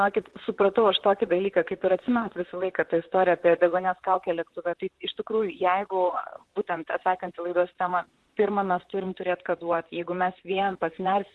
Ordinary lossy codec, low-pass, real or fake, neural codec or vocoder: Opus, 16 kbps; 10.8 kHz; fake; vocoder, 44.1 kHz, 128 mel bands, Pupu-Vocoder